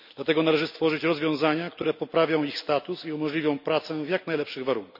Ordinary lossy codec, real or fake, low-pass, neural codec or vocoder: none; real; 5.4 kHz; none